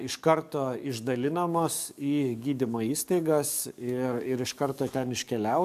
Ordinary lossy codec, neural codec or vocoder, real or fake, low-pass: MP3, 96 kbps; codec, 44.1 kHz, 7.8 kbps, DAC; fake; 14.4 kHz